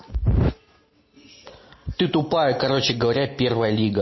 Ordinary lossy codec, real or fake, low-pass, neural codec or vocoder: MP3, 24 kbps; real; 7.2 kHz; none